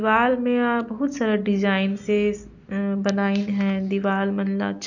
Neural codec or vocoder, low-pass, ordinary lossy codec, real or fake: none; 7.2 kHz; none; real